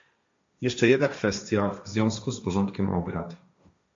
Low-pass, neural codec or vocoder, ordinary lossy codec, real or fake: 7.2 kHz; codec, 16 kHz, 1.1 kbps, Voila-Tokenizer; MP3, 48 kbps; fake